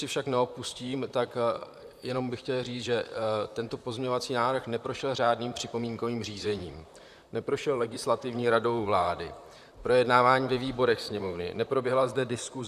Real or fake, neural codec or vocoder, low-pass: fake; vocoder, 44.1 kHz, 128 mel bands, Pupu-Vocoder; 14.4 kHz